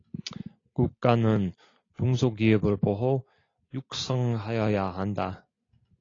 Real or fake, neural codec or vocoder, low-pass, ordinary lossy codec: real; none; 7.2 kHz; AAC, 32 kbps